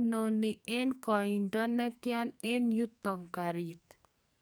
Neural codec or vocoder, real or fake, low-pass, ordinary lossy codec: codec, 44.1 kHz, 2.6 kbps, SNAC; fake; none; none